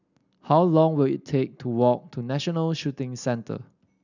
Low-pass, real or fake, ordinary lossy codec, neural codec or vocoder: 7.2 kHz; real; none; none